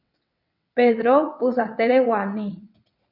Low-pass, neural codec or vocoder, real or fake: 5.4 kHz; vocoder, 22.05 kHz, 80 mel bands, WaveNeXt; fake